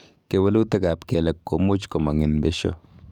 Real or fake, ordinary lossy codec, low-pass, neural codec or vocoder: fake; none; 19.8 kHz; codec, 44.1 kHz, 7.8 kbps, DAC